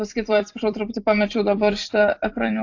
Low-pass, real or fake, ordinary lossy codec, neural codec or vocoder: 7.2 kHz; fake; AAC, 48 kbps; vocoder, 44.1 kHz, 128 mel bands every 256 samples, BigVGAN v2